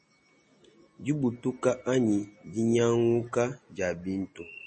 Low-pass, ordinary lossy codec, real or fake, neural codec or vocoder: 10.8 kHz; MP3, 32 kbps; real; none